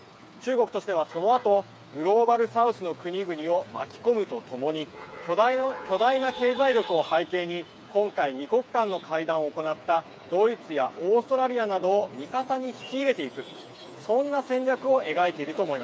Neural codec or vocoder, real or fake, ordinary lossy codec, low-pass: codec, 16 kHz, 4 kbps, FreqCodec, smaller model; fake; none; none